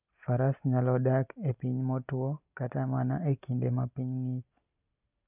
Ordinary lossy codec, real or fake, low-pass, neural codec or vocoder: none; real; 3.6 kHz; none